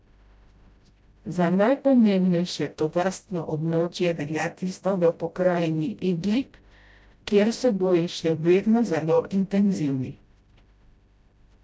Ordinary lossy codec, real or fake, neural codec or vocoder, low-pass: none; fake; codec, 16 kHz, 0.5 kbps, FreqCodec, smaller model; none